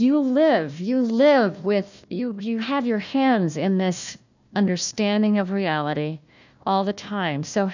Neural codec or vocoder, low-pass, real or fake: codec, 16 kHz, 1 kbps, FunCodec, trained on Chinese and English, 50 frames a second; 7.2 kHz; fake